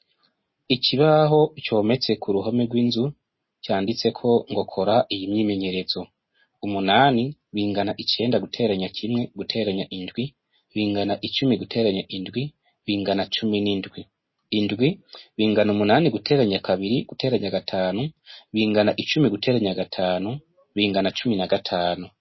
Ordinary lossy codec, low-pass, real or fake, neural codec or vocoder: MP3, 24 kbps; 7.2 kHz; real; none